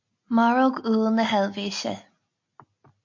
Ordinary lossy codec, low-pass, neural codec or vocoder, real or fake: MP3, 48 kbps; 7.2 kHz; none; real